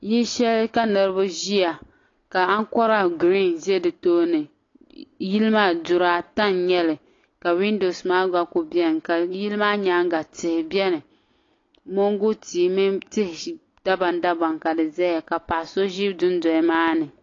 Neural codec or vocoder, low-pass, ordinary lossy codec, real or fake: none; 7.2 kHz; AAC, 32 kbps; real